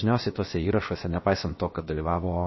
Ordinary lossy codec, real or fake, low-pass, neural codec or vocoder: MP3, 24 kbps; fake; 7.2 kHz; codec, 16 kHz, 0.7 kbps, FocalCodec